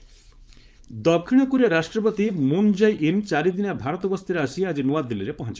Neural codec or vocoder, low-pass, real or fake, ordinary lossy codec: codec, 16 kHz, 4.8 kbps, FACodec; none; fake; none